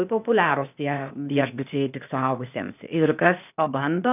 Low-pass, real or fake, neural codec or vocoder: 3.6 kHz; fake; codec, 16 kHz, 0.8 kbps, ZipCodec